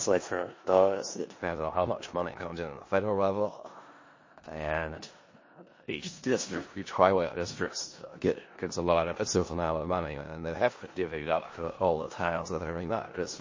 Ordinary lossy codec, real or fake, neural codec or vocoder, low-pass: MP3, 32 kbps; fake; codec, 16 kHz in and 24 kHz out, 0.4 kbps, LongCat-Audio-Codec, four codebook decoder; 7.2 kHz